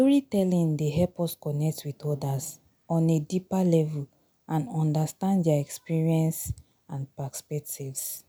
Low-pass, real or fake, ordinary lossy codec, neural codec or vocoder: none; real; none; none